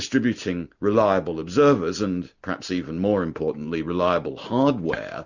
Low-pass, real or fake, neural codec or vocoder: 7.2 kHz; real; none